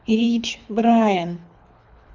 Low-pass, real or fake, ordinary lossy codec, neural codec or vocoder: 7.2 kHz; fake; none; codec, 24 kHz, 3 kbps, HILCodec